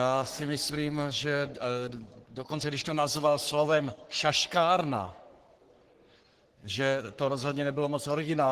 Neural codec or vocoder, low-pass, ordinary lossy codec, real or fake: codec, 44.1 kHz, 3.4 kbps, Pupu-Codec; 14.4 kHz; Opus, 16 kbps; fake